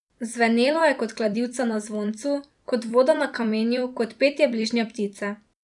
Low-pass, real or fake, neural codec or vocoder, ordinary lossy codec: 10.8 kHz; fake; vocoder, 44.1 kHz, 128 mel bands every 256 samples, BigVGAN v2; none